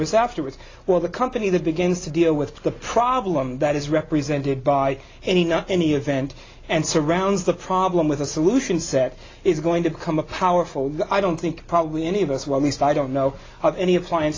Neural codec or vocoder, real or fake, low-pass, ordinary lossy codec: none; real; 7.2 kHz; AAC, 32 kbps